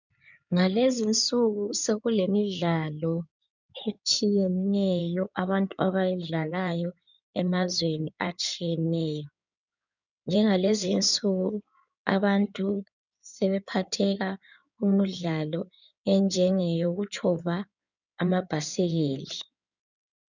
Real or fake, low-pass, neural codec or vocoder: fake; 7.2 kHz; codec, 16 kHz in and 24 kHz out, 2.2 kbps, FireRedTTS-2 codec